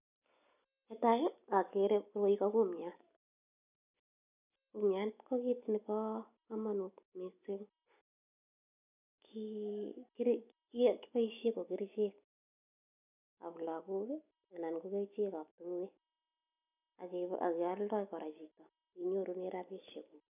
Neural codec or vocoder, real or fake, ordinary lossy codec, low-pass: none; real; none; 3.6 kHz